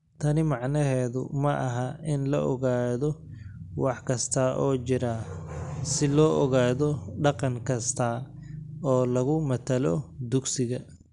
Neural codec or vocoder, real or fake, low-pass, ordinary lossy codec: none; real; 10.8 kHz; none